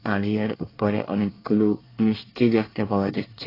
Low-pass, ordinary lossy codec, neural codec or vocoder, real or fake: 5.4 kHz; AAC, 24 kbps; codec, 24 kHz, 1 kbps, SNAC; fake